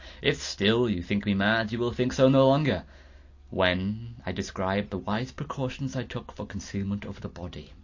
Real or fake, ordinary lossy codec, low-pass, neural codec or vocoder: real; AAC, 48 kbps; 7.2 kHz; none